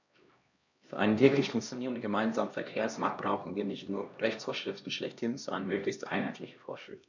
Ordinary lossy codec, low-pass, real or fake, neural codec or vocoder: none; 7.2 kHz; fake; codec, 16 kHz, 1 kbps, X-Codec, HuBERT features, trained on LibriSpeech